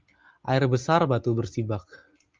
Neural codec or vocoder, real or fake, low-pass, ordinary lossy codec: none; real; 7.2 kHz; Opus, 24 kbps